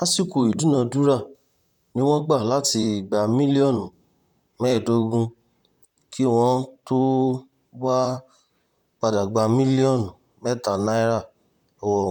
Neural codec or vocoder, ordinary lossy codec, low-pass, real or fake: vocoder, 44.1 kHz, 128 mel bands, Pupu-Vocoder; none; 19.8 kHz; fake